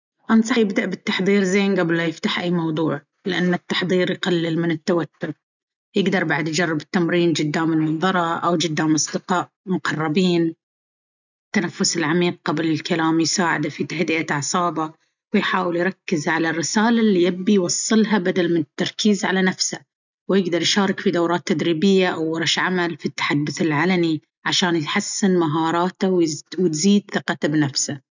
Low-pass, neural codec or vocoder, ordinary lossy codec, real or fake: 7.2 kHz; none; none; real